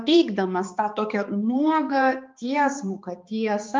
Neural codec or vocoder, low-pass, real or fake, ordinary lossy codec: codec, 16 kHz, 2 kbps, X-Codec, HuBERT features, trained on balanced general audio; 7.2 kHz; fake; Opus, 24 kbps